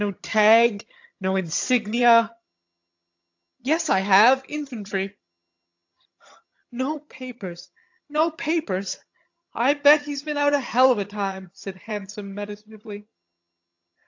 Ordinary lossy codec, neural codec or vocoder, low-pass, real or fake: AAC, 48 kbps; vocoder, 22.05 kHz, 80 mel bands, HiFi-GAN; 7.2 kHz; fake